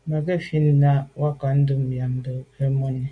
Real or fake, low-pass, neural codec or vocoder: real; 9.9 kHz; none